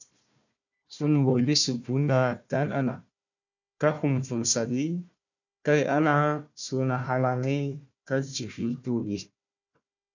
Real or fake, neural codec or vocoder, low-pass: fake; codec, 16 kHz, 1 kbps, FunCodec, trained on Chinese and English, 50 frames a second; 7.2 kHz